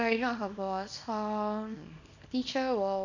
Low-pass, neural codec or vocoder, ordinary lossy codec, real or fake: 7.2 kHz; codec, 24 kHz, 0.9 kbps, WavTokenizer, small release; none; fake